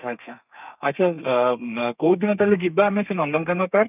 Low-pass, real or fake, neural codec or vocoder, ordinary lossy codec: 3.6 kHz; fake; codec, 32 kHz, 1.9 kbps, SNAC; none